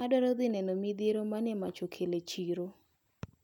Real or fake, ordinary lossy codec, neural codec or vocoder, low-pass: real; none; none; 19.8 kHz